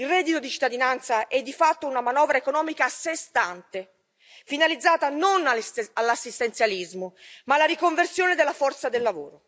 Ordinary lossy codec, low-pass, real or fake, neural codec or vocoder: none; none; real; none